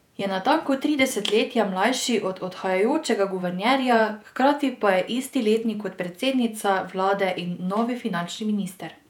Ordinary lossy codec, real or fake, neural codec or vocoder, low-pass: none; fake; vocoder, 48 kHz, 128 mel bands, Vocos; 19.8 kHz